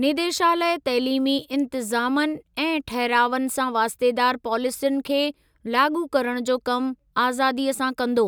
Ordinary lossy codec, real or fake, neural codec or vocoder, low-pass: none; real; none; none